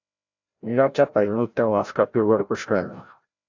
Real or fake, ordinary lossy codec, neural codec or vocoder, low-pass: fake; AAC, 48 kbps; codec, 16 kHz, 0.5 kbps, FreqCodec, larger model; 7.2 kHz